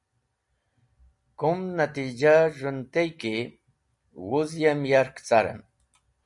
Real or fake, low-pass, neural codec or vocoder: real; 10.8 kHz; none